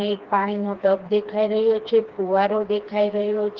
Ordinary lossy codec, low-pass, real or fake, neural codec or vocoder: Opus, 16 kbps; 7.2 kHz; fake; codec, 16 kHz, 2 kbps, FreqCodec, smaller model